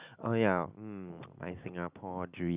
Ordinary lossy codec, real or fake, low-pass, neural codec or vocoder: Opus, 64 kbps; real; 3.6 kHz; none